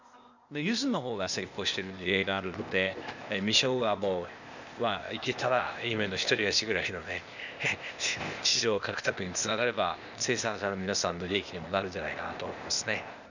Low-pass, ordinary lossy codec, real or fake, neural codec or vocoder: 7.2 kHz; none; fake; codec, 16 kHz, 0.8 kbps, ZipCodec